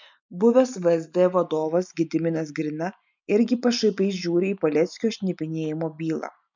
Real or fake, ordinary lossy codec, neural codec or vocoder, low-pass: real; MP3, 64 kbps; none; 7.2 kHz